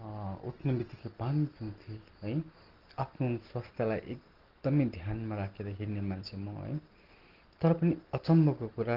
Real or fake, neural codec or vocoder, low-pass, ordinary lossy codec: real; none; 5.4 kHz; Opus, 16 kbps